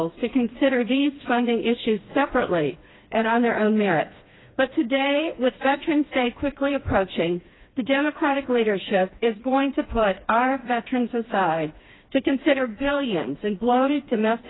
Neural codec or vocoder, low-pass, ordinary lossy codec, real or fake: codec, 16 kHz, 2 kbps, FreqCodec, smaller model; 7.2 kHz; AAC, 16 kbps; fake